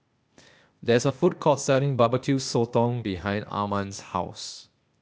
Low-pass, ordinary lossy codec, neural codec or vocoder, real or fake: none; none; codec, 16 kHz, 0.8 kbps, ZipCodec; fake